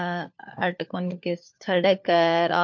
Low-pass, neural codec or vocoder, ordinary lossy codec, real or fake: 7.2 kHz; codec, 16 kHz, 4 kbps, FunCodec, trained on LibriTTS, 50 frames a second; MP3, 48 kbps; fake